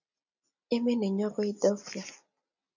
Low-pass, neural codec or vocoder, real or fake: 7.2 kHz; none; real